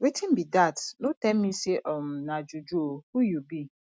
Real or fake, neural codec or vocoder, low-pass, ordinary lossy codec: real; none; none; none